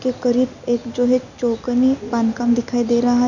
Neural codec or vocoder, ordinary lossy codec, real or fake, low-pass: none; none; real; 7.2 kHz